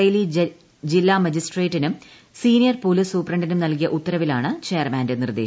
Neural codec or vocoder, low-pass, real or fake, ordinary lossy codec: none; none; real; none